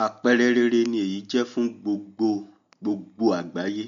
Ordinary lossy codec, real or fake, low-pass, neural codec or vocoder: MP3, 48 kbps; real; 7.2 kHz; none